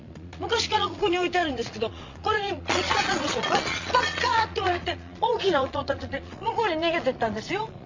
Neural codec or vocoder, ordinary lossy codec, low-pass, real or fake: vocoder, 22.05 kHz, 80 mel bands, Vocos; MP3, 48 kbps; 7.2 kHz; fake